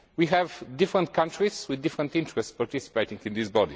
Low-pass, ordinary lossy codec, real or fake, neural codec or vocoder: none; none; real; none